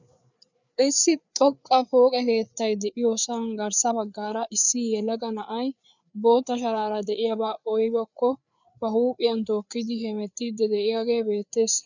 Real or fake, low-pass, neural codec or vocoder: fake; 7.2 kHz; codec, 16 kHz, 4 kbps, FreqCodec, larger model